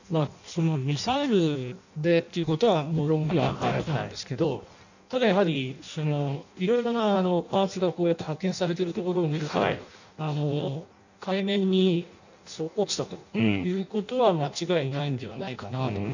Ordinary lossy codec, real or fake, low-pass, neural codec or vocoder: none; fake; 7.2 kHz; codec, 16 kHz in and 24 kHz out, 0.6 kbps, FireRedTTS-2 codec